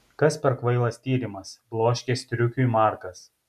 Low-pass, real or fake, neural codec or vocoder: 14.4 kHz; real; none